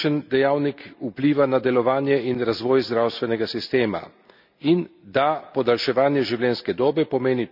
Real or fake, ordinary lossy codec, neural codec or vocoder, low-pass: real; none; none; 5.4 kHz